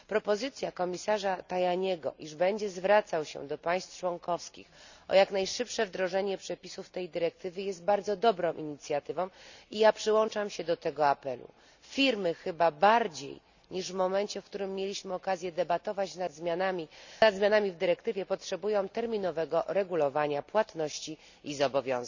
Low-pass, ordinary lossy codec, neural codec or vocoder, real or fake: 7.2 kHz; none; none; real